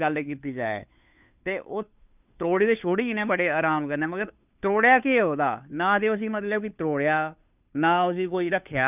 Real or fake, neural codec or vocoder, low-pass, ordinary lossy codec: fake; codec, 16 kHz, 2 kbps, FunCodec, trained on Chinese and English, 25 frames a second; 3.6 kHz; none